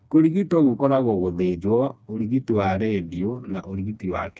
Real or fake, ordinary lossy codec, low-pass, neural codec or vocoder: fake; none; none; codec, 16 kHz, 2 kbps, FreqCodec, smaller model